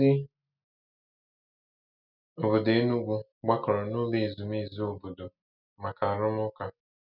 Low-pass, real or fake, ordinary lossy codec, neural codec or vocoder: 5.4 kHz; real; none; none